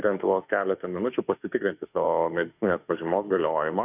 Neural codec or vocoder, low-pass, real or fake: autoencoder, 48 kHz, 32 numbers a frame, DAC-VAE, trained on Japanese speech; 3.6 kHz; fake